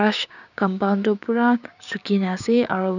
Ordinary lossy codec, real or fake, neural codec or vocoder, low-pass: none; fake; codec, 16 kHz, 6 kbps, DAC; 7.2 kHz